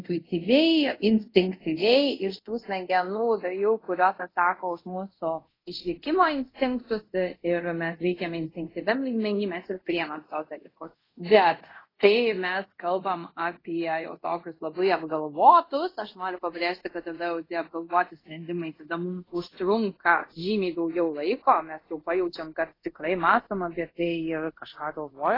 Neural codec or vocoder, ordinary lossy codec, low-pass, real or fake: codec, 24 kHz, 0.5 kbps, DualCodec; AAC, 24 kbps; 5.4 kHz; fake